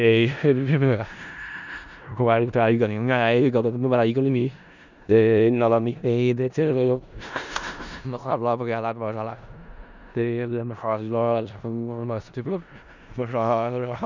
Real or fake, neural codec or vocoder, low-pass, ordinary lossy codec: fake; codec, 16 kHz in and 24 kHz out, 0.4 kbps, LongCat-Audio-Codec, four codebook decoder; 7.2 kHz; none